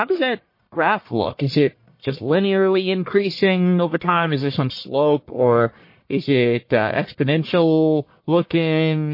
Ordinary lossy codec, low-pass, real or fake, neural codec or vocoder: MP3, 32 kbps; 5.4 kHz; fake; codec, 44.1 kHz, 1.7 kbps, Pupu-Codec